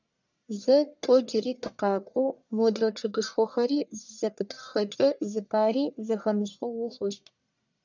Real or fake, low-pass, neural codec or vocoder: fake; 7.2 kHz; codec, 44.1 kHz, 1.7 kbps, Pupu-Codec